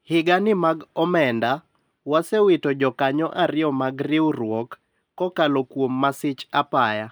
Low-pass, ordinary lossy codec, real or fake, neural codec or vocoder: none; none; real; none